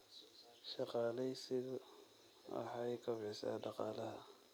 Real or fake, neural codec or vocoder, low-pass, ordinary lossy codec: fake; vocoder, 44.1 kHz, 128 mel bands every 256 samples, BigVGAN v2; none; none